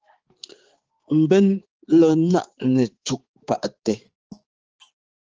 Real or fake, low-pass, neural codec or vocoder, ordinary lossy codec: fake; 7.2 kHz; codec, 16 kHz, 4 kbps, X-Codec, HuBERT features, trained on balanced general audio; Opus, 16 kbps